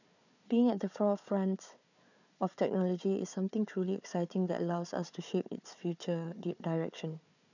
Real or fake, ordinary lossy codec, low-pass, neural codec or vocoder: fake; none; 7.2 kHz; codec, 16 kHz, 4 kbps, FunCodec, trained on Chinese and English, 50 frames a second